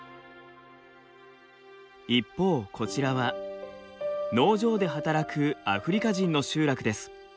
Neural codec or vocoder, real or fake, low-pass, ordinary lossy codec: none; real; none; none